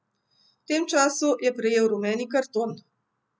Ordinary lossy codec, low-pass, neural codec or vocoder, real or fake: none; none; none; real